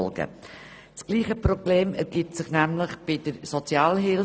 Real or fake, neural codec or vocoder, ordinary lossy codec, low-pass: real; none; none; none